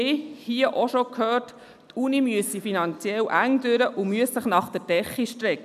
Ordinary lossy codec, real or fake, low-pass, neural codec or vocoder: none; real; 14.4 kHz; none